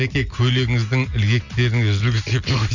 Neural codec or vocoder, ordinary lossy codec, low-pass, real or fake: none; none; 7.2 kHz; real